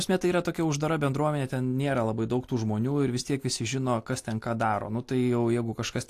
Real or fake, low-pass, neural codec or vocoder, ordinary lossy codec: real; 14.4 kHz; none; AAC, 64 kbps